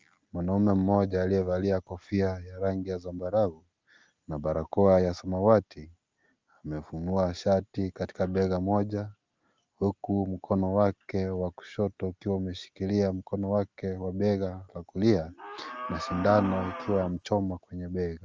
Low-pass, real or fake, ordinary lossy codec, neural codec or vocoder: 7.2 kHz; real; Opus, 24 kbps; none